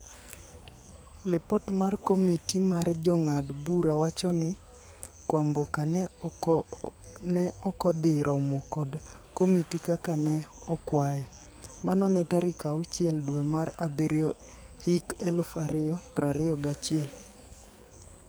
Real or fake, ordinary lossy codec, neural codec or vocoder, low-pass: fake; none; codec, 44.1 kHz, 2.6 kbps, SNAC; none